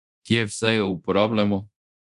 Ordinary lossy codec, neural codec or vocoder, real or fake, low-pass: none; codec, 24 kHz, 0.9 kbps, DualCodec; fake; 10.8 kHz